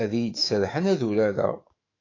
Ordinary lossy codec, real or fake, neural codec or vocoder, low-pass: AAC, 32 kbps; fake; autoencoder, 48 kHz, 128 numbers a frame, DAC-VAE, trained on Japanese speech; 7.2 kHz